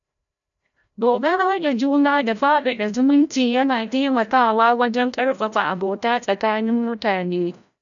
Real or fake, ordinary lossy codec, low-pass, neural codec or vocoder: fake; none; 7.2 kHz; codec, 16 kHz, 0.5 kbps, FreqCodec, larger model